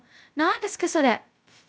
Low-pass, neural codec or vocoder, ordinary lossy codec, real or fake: none; codec, 16 kHz, 0.2 kbps, FocalCodec; none; fake